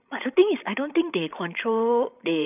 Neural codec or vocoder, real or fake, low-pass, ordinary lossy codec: codec, 16 kHz, 16 kbps, FreqCodec, larger model; fake; 3.6 kHz; none